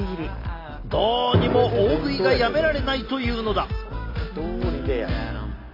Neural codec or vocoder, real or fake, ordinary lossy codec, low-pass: none; real; AAC, 32 kbps; 5.4 kHz